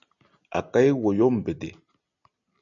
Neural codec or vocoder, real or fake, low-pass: none; real; 7.2 kHz